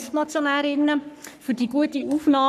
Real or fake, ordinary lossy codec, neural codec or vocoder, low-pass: fake; none; codec, 44.1 kHz, 3.4 kbps, Pupu-Codec; 14.4 kHz